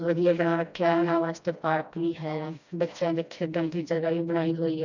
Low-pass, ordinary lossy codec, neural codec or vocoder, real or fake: 7.2 kHz; none; codec, 16 kHz, 1 kbps, FreqCodec, smaller model; fake